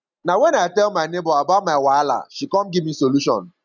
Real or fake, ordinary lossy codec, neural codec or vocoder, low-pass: real; none; none; 7.2 kHz